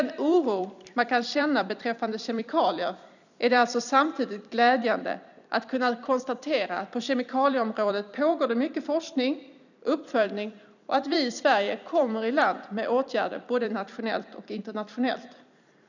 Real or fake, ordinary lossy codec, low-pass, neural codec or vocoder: fake; none; 7.2 kHz; vocoder, 44.1 kHz, 128 mel bands every 256 samples, BigVGAN v2